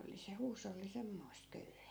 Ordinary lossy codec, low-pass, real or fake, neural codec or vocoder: none; none; real; none